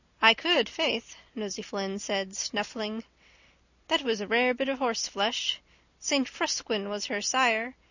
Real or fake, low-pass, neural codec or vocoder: real; 7.2 kHz; none